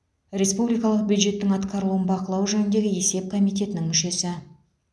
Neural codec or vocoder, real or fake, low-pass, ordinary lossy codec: none; real; none; none